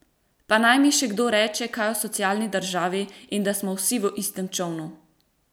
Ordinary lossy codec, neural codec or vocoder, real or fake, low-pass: none; none; real; none